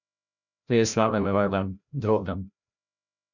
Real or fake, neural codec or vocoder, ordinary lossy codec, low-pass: fake; codec, 16 kHz, 0.5 kbps, FreqCodec, larger model; none; 7.2 kHz